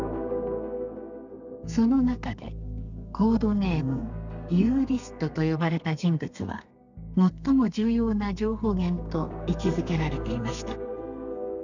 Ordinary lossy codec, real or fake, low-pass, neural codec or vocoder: none; fake; 7.2 kHz; codec, 32 kHz, 1.9 kbps, SNAC